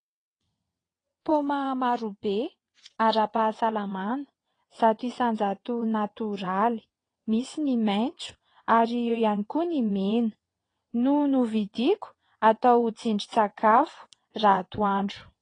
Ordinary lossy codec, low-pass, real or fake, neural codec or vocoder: AAC, 32 kbps; 9.9 kHz; fake; vocoder, 22.05 kHz, 80 mel bands, WaveNeXt